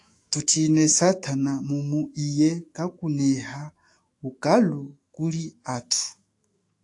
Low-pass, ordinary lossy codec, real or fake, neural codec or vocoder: 10.8 kHz; AAC, 48 kbps; fake; autoencoder, 48 kHz, 128 numbers a frame, DAC-VAE, trained on Japanese speech